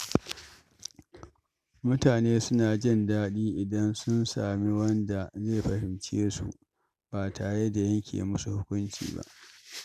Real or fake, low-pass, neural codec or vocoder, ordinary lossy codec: real; 14.4 kHz; none; none